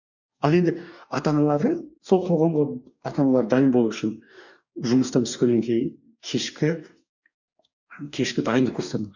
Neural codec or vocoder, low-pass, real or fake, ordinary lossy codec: codec, 44.1 kHz, 2.6 kbps, DAC; 7.2 kHz; fake; none